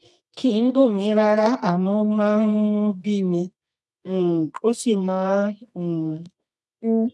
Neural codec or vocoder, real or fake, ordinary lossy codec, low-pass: codec, 24 kHz, 0.9 kbps, WavTokenizer, medium music audio release; fake; none; none